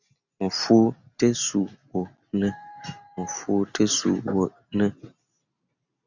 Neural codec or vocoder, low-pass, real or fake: none; 7.2 kHz; real